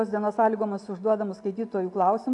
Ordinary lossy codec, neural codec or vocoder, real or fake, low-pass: AAC, 64 kbps; none; real; 10.8 kHz